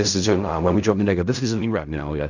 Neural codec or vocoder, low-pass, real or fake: codec, 16 kHz in and 24 kHz out, 0.4 kbps, LongCat-Audio-Codec, fine tuned four codebook decoder; 7.2 kHz; fake